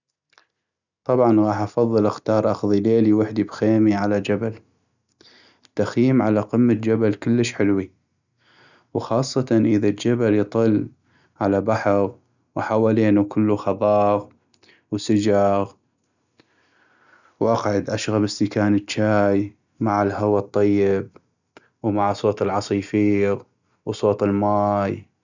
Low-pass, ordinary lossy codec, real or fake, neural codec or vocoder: 7.2 kHz; none; real; none